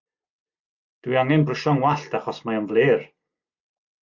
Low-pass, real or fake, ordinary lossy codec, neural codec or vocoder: 7.2 kHz; real; Opus, 64 kbps; none